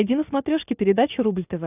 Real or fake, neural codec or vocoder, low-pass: real; none; 3.6 kHz